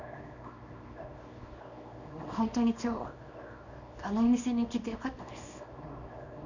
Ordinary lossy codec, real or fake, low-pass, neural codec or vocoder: none; fake; 7.2 kHz; codec, 24 kHz, 0.9 kbps, WavTokenizer, small release